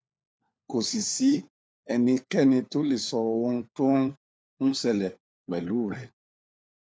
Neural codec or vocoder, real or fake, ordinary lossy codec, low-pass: codec, 16 kHz, 4 kbps, FunCodec, trained on LibriTTS, 50 frames a second; fake; none; none